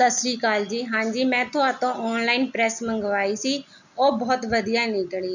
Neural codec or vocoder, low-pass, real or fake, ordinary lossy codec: none; 7.2 kHz; real; none